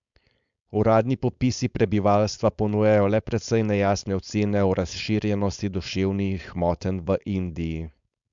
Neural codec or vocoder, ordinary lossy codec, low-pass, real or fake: codec, 16 kHz, 4.8 kbps, FACodec; MP3, 64 kbps; 7.2 kHz; fake